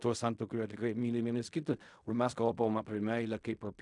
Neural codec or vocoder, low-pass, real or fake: codec, 16 kHz in and 24 kHz out, 0.4 kbps, LongCat-Audio-Codec, fine tuned four codebook decoder; 10.8 kHz; fake